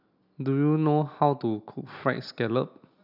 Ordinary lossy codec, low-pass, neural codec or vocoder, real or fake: none; 5.4 kHz; none; real